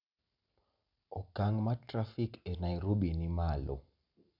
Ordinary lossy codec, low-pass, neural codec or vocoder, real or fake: none; 5.4 kHz; none; real